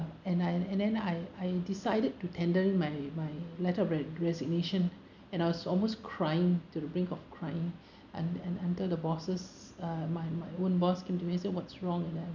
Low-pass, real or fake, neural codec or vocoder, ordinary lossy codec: 7.2 kHz; real; none; none